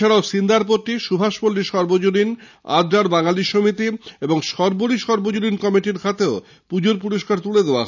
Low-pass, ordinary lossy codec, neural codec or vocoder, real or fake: 7.2 kHz; none; none; real